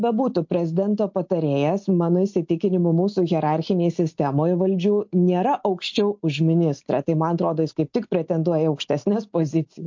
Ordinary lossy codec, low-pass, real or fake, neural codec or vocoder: MP3, 48 kbps; 7.2 kHz; real; none